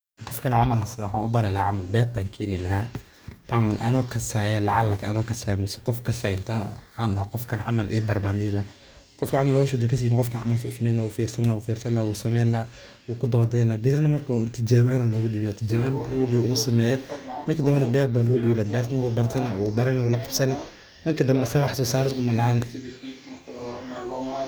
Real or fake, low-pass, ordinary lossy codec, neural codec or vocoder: fake; none; none; codec, 44.1 kHz, 2.6 kbps, DAC